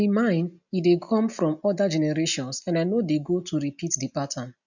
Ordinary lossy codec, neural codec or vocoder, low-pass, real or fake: none; none; 7.2 kHz; real